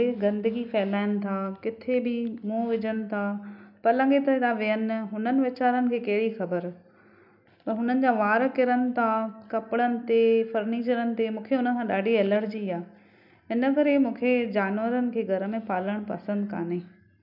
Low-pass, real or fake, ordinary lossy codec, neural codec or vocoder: 5.4 kHz; real; none; none